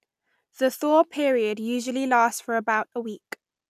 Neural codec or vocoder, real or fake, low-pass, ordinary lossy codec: none; real; 14.4 kHz; none